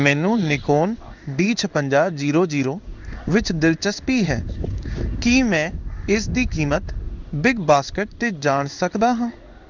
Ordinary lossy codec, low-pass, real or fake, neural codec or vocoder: none; 7.2 kHz; fake; codec, 16 kHz in and 24 kHz out, 1 kbps, XY-Tokenizer